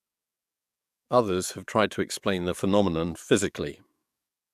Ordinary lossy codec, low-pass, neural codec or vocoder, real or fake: MP3, 96 kbps; 14.4 kHz; codec, 44.1 kHz, 7.8 kbps, DAC; fake